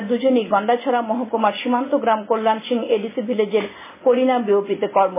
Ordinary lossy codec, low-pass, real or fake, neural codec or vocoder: MP3, 16 kbps; 3.6 kHz; real; none